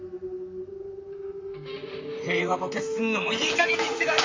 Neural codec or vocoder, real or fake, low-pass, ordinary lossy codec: vocoder, 44.1 kHz, 128 mel bands, Pupu-Vocoder; fake; 7.2 kHz; MP3, 48 kbps